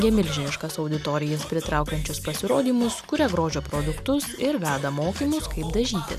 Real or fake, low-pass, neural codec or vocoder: real; 14.4 kHz; none